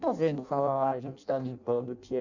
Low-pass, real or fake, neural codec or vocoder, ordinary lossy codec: 7.2 kHz; fake; codec, 16 kHz in and 24 kHz out, 0.6 kbps, FireRedTTS-2 codec; none